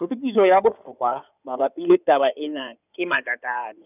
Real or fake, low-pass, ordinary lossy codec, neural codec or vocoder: fake; 3.6 kHz; none; codec, 16 kHz in and 24 kHz out, 2.2 kbps, FireRedTTS-2 codec